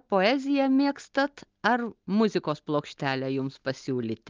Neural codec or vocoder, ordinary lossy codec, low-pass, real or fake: none; Opus, 24 kbps; 7.2 kHz; real